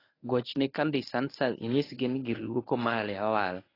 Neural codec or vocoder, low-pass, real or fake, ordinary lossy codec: codec, 24 kHz, 0.9 kbps, WavTokenizer, medium speech release version 1; 5.4 kHz; fake; AAC, 24 kbps